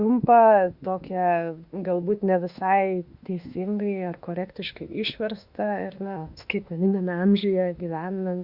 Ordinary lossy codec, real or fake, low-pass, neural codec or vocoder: Opus, 64 kbps; fake; 5.4 kHz; autoencoder, 48 kHz, 32 numbers a frame, DAC-VAE, trained on Japanese speech